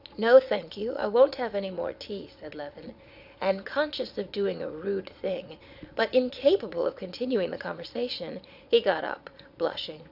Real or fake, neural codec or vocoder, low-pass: fake; vocoder, 22.05 kHz, 80 mel bands, Vocos; 5.4 kHz